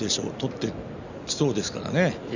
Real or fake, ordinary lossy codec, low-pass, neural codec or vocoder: real; none; 7.2 kHz; none